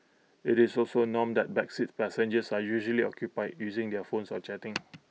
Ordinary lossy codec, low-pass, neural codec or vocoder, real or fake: none; none; none; real